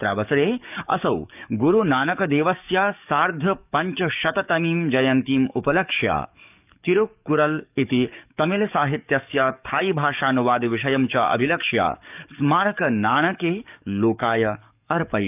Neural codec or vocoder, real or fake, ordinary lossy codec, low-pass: codec, 44.1 kHz, 7.8 kbps, DAC; fake; none; 3.6 kHz